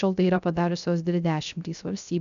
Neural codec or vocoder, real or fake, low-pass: codec, 16 kHz, 0.3 kbps, FocalCodec; fake; 7.2 kHz